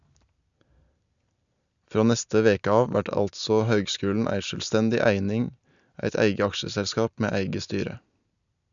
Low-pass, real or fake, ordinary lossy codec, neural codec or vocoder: 7.2 kHz; real; none; none